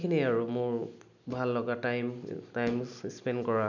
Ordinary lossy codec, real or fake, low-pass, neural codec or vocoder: none; real; 7.2 kHz; none